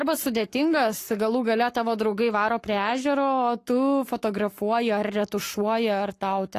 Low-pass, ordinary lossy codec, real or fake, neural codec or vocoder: 14.4 kHz; AAC, 48 kbps; fake; codec, 44.1 kHz, 7.8 kbps, Pupu-Codec